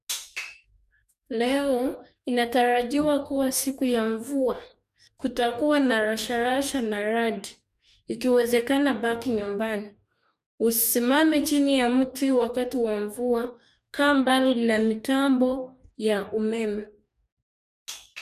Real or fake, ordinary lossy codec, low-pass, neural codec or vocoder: fake; none; 14.4 kHz; codec, 44.1 kHz, 2.6 kbps, DAC